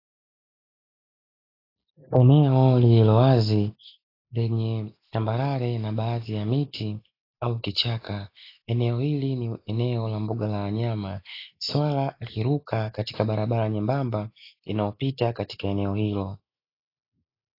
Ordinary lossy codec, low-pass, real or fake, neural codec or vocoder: AAC, 32 kbps; 5.4 kHz; real; none